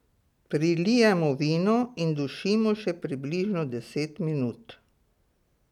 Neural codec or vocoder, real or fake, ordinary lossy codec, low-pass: none; real; none; 19.8 kHz